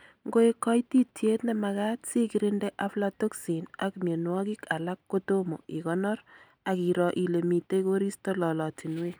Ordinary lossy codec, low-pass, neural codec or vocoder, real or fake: none; none; none; real